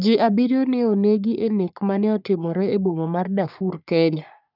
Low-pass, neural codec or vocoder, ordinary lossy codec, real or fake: 5.4 kHz; codec, 44.1 kHz, 3.4 kbps, Pupu-Codec; none; fake